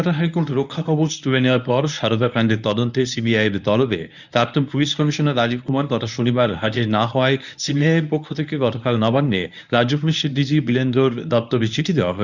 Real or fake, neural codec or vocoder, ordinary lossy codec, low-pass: fake; codec, 24 kHz, 0.9 kbps, WavTokenizer, medium speech release version 1; none; 7.2 kHz